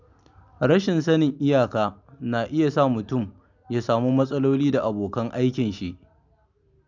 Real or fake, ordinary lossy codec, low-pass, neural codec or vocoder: real; none; 7.2 kHz; none